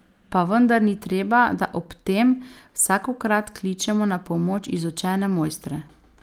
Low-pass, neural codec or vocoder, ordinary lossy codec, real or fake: 19.8 kHz; none; Opus, 32 kbps; real